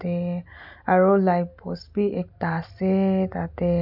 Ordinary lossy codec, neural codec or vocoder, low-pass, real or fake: none; none; 5.4 kHz; real